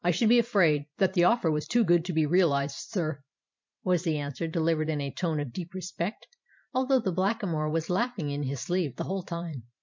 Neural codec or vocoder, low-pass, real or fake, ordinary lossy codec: vocoder, 44.1 kHz, 128 mel bands every 512 samples, BigVGAN v2; 7.2 kHz; fake; MP3, 48 kbps